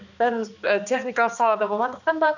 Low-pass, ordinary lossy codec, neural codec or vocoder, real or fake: 7.2 kHz; none; codec, 16 kHz, 2 kbps, X-Codec, HuBERT features, trained on balanced general audio; fake